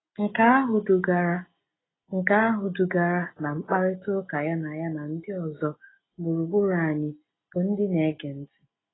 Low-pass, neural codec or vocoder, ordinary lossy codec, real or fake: 7.2 kHz; none; AAC, 16 kbps; real